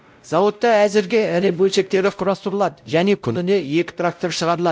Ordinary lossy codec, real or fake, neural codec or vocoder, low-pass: none; fake; codec, 16 kHz, 0.5 kbps, X-Codec, WavLM features, trained on Multilingual LibriSpeech; none